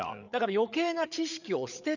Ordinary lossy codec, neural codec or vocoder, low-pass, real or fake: none; codec, 16 kHz, 8 kbps, FreqCodec, larger model; 7.2 kHz; fake